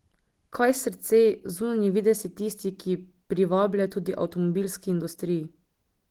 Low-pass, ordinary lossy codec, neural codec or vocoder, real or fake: 19.8 kHz; Opus, 16 kbps; none; real